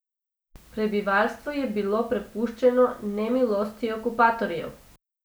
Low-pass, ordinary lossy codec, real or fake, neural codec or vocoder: none; none; real; none